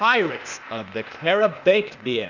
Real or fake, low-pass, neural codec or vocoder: fake; 7.2 kHz; codec, 16 kHz, 0.8 kbps, ZipCodec